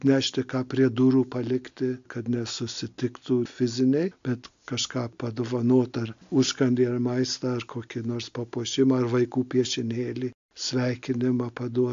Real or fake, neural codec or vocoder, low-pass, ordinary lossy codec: real; none; 7.2 kHz; AAC, 64 kbps